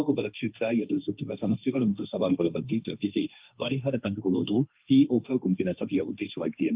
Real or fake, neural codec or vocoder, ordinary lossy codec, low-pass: fake; codec, 16 kHz, 1.1 kbps, Voila-Tokenizer; Opus, 24 kbps; 3.6 kHz